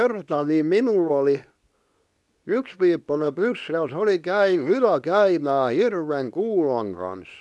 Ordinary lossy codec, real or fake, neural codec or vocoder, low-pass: none; fake; codec, 24 kHz, 0.9 kbps, WavTokenizer, medium speech release version 2; none